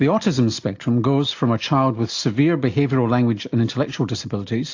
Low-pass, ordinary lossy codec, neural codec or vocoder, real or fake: 7.2 kHz; AAC, 48 kbps; none; real